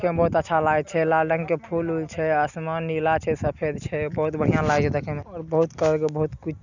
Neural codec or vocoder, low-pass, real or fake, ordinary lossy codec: none; 7.2 kHz; real; none